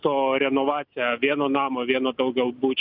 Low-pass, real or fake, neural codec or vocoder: 5.4 kHz; real; none